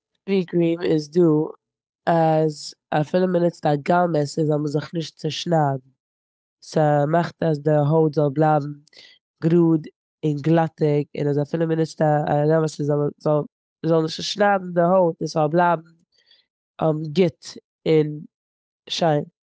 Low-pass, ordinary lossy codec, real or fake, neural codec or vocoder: none; none; fake; codec, 16 kHz, 8 kbps, FunCodec, trained on Chinese and English, 25 frames a second